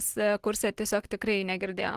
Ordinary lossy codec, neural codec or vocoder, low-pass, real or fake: Opus, 16 kbps; none; 14.4 kHz; real